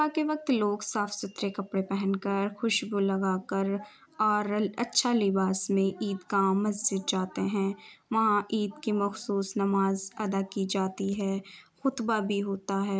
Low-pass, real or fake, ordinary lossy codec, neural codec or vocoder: none; real; none; none